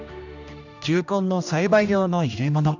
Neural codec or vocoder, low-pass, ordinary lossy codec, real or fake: codec, 16 kHz, 1 kbps, X-Codec, HuBERT features, trained on general audio; 7.2 kHz; none; fake